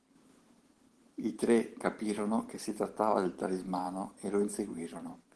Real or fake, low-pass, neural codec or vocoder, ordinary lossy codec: fake; 10.8 kHz; vocoder, 44.1 kHz, 128 mel bands every 512 samples, BigVGAN v2; Opus, 16 kbps